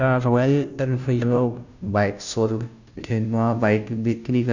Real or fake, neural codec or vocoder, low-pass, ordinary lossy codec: fake; codec, 16 kHz, 0.5 kbps, FunCodec, trained on Chinese and English, 25 frames a second; 7.2 kHz; none